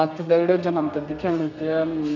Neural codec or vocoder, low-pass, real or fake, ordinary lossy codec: codec, 32 kHz, 1.9 kbps, SNAC; 7.2 kHz; fake; none